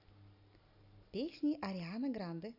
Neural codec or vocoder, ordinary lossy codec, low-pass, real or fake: none; none; 5.4 kHz; real